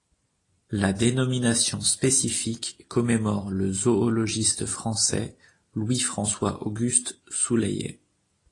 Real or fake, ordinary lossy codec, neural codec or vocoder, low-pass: real; AAC, 32 kbps; none; 10.8 kHz